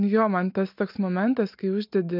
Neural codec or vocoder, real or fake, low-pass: none; real; 5.4 kHz